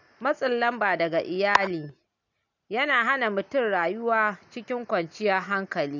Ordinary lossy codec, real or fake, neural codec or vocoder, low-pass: none; real; none; 7.2 kHz